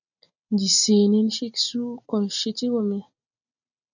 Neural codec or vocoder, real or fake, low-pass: none; real; 7.2 kHz